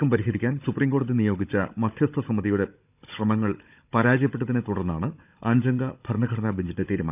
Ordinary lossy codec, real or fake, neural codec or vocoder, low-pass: none; fake; codec, 16 kHz, 16 kbps, FunCodec, trained on Chinese and English, 50 frames a second; 3.6 kHz